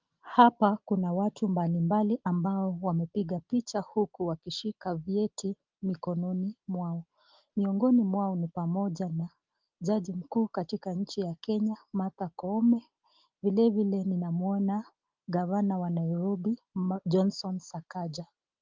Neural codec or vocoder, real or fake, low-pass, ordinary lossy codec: none; real; 7.2 kHz; Opus, 32 kbps